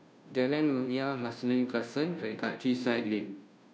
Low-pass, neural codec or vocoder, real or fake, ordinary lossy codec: none; codec, 16 kHz, 0.5 kbps, FunCodec, trained on Chinese and English, 25 frames a second; fake; none